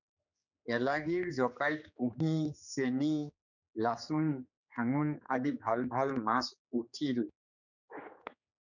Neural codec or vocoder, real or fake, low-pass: codec, 16 kHz, 4 kbps, X-Codec, HuBERT features, trained on general audio; fake; 7.2 kHz